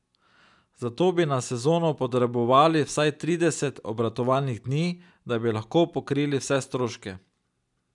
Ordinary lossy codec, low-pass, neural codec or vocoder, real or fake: none; 10.8 kHz; none; real